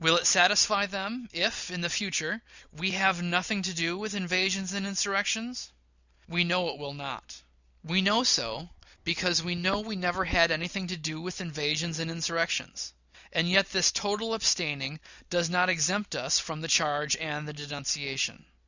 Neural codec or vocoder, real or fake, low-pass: none; real; 7.2 kHz